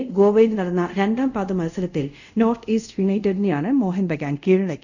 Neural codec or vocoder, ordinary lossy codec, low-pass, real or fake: codec, 24 kHz, 0.5 kbps, DualCodec; none; 7.2 kHz; fake